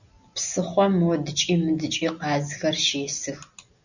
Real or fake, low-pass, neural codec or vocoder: real; 7.2 kHz; none